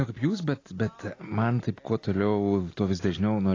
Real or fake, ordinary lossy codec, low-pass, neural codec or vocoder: real; AAC, 32 kbps; 7.2 kHz; none